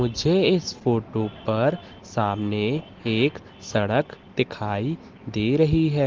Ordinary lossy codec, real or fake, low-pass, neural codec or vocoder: Opus, 16 kbps; real; 7.2 kHz; none